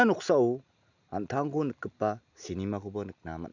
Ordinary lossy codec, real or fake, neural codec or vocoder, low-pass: none; real; none; 7.2 kHz